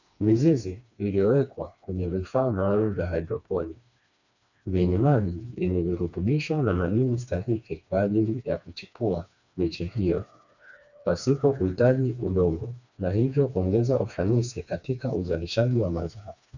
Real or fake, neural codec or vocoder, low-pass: fake; codec, 16 kHz, 2 kbps, FreqCodec, smaller model; 7.2 kHz